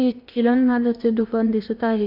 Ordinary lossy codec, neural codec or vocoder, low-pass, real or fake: AAC, 48 kbps; codec, 16 kHz in and 24 kHz out, 0.8 kbps, FocalCodec, streaming, 65536 codes; 5.4 kHz; fake